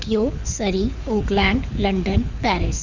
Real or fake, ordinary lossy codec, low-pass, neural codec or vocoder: fake; none; 7.2 kHz; codec, 24 kHz, 6 kbps, HILCodec